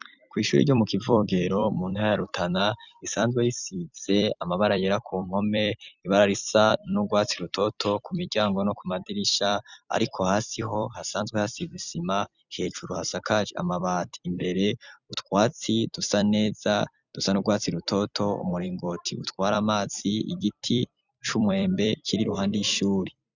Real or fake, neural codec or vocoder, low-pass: fake; vocoder, 44.1 kHz, 128 mel bands every 256 samples, BigVGAN v2; 7.2 kHz